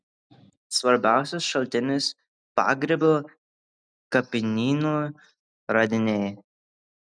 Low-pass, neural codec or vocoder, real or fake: 9.9 kHz; none; real